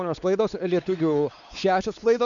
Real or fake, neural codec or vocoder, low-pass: fake; codec, 16 kHz, 4 kbps, X-Codec, WavLM features, trained on Multilingual LibriSpeech; 7.2 kHz